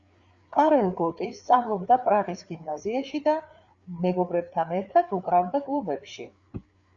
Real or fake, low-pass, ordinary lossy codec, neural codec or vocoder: fake; 7.2 kHz; Opus, 64 kbps; codec, 16 kHz, 4 kbps, FreqCodec, larger model